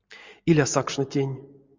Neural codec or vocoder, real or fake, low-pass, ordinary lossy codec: none; real; 7.2 kHz; MP3, 64 kbps